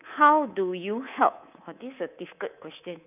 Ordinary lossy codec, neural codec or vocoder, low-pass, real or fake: none; codec, 24 kHz, 3.1 kbps, DualCodec; 3.6 kHz; fake